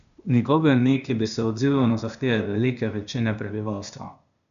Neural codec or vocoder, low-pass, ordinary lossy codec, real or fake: codec, 16 kHz, 0.8 kbps, ZipCodec; 7.2 kHz; none; fake